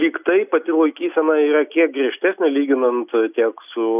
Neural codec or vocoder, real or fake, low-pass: none; real; 3.6 kHz